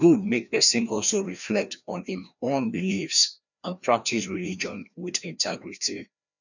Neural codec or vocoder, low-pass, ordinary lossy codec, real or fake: codec, 16 kHz, 1 kbps, FreqCodec, larger model; 7.2 kHz; none; fake